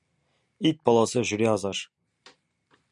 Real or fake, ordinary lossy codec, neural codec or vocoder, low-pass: real; MP3, 96 kbps; none; 10.8 kHz